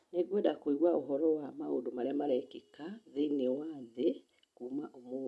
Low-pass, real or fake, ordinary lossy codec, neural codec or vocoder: none; real; none; none